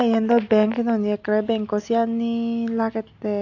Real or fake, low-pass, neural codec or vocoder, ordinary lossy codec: real; 7.2 kHz; none; AAC, 48 kbps